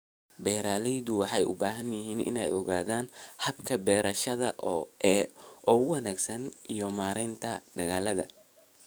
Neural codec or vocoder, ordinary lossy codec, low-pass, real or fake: codec, 44.1 kHz, 7.8 kbps, DAC; none; none; fake